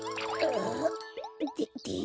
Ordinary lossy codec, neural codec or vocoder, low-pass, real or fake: none; none; none; real